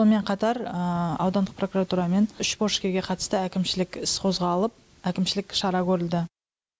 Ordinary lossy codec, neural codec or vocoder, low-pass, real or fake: none; none; none; real